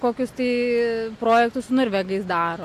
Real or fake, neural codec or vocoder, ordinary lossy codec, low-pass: real; none; AAC, 64 kbps; 14.4 kHz